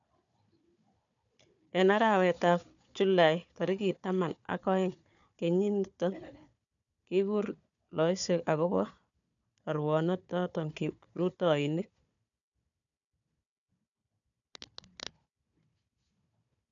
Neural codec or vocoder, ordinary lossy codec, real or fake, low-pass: codec, 16 kHz, 4 kbps, FunCodec, trained on Chinese and English, 50 frames a second; none; fake; 7.2 kHz